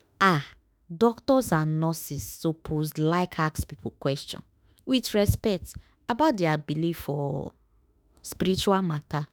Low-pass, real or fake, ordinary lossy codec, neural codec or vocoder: none; fake; none; autoencoder, 48 kHz, 32 numbers a frame, DAC-VAE, trained on Japanese speech